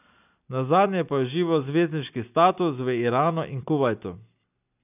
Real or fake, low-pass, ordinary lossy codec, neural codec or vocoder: real; 3.6 kHz; none; none